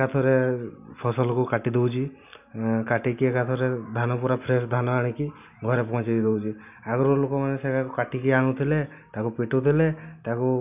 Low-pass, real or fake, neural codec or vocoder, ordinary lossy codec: 3.6 kHz; real; none; none